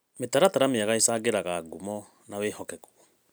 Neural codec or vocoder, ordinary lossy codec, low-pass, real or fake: none; none; none; real